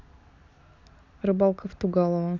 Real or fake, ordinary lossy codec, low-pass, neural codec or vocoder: real; none; 7.2 kHz; none